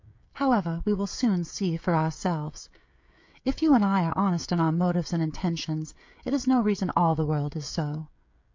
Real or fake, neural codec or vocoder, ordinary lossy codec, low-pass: fake; codec, 16 kHz, 16 kbps, FreqCodec, smaller model; MP3, 48 kbps; 7.2 kHz